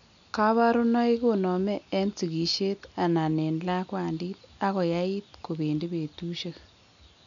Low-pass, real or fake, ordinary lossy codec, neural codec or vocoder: 7.2 kHz; real; none; none